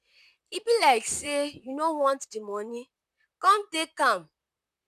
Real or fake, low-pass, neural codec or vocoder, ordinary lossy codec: fake; 14.4 kHz; vocoder, 44.1 kHz, 128 mel bands, Pupu-Vocoder; none